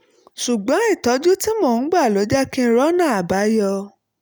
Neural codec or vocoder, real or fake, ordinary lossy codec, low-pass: none; real; none; none